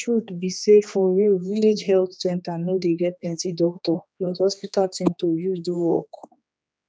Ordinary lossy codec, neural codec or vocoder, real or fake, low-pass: none; codec, 16 kHz, 2 kbps, X-Codec, HuBERT features, trained on general audio; fake; none